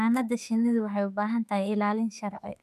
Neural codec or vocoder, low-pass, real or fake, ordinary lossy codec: autoencoder, 48 kHz, 32 numbers a frame, DAC-VAE, trained on Japanese speech; 14.4 kHz; fake; none